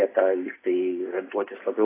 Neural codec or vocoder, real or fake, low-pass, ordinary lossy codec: none; real; 3.6 kHz; AAC, 16 kbps